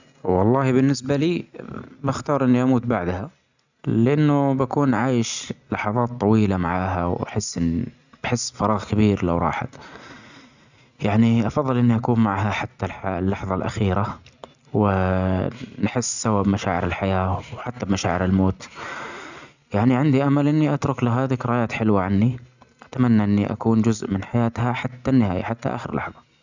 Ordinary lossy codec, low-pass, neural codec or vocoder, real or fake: none; 7.2 kHz; none; real